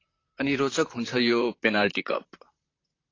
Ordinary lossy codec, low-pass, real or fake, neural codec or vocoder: AAC, 32 kbps; 7.2 kHz; fake; vocoder, 44.1 kHz, 128 mel bands, Pupu-Vocoder